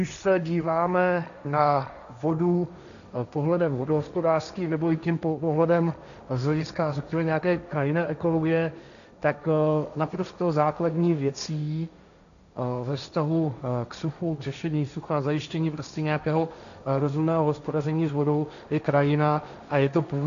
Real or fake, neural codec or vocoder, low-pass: fake; codec, 16 kHz, 1.1 kbps, Voila-Tokenizer; 7.2 kHz